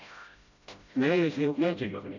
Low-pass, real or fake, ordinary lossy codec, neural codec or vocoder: 7.2 kHz; fake; none; codec, 16 kHz, 0.5 kbps, FreqCodec, smaller model